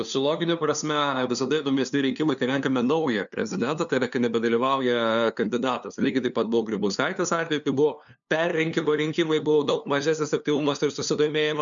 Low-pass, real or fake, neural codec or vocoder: 7.2 kHz; fake; codec, 16 kHz, 2 kbps, FunCodec, trained on LibriTTS, 25 frames a second